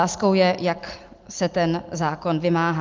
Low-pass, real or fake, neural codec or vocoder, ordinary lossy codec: 7.2 kHz; real; none; Opus, 32 kbps